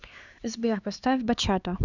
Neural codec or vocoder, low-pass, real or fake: codec, 16 kHz, 4 kbps, X-Codec, HuBERT features, trained on LibriSpeech; 7.2 kHz; fake